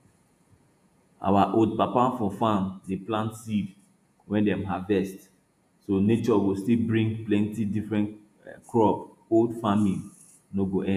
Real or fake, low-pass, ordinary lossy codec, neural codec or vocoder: fake; 14.4 kHz; AAC, 96 kbps; vocoder, 48 kHz, 128 mel bands, Vocos